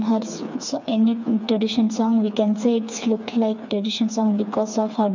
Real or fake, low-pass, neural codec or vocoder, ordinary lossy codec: fake; 7.2 kHz; codec, 16 kHz, 4 kbps, FreqCodec, smaller model; none